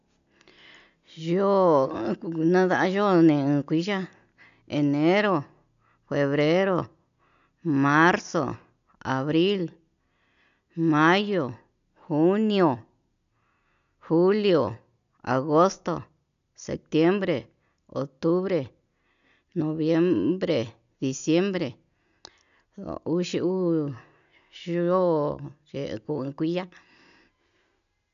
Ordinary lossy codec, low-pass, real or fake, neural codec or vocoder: none; 7.2 kHz; real; none